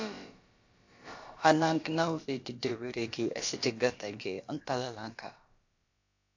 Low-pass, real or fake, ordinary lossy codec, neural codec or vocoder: 7.2 kHz; fake; AAC, 48 kbps; codec, 16 kHz, about 1 kbps, DyCAST, with the encoder's durations